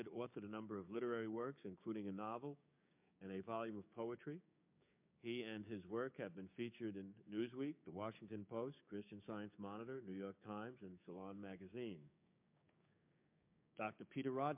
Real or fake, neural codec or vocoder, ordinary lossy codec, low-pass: fake; codec, 16 kHz, 6 kbps, DAC; MP3, 32 kbps; 3.6 kHz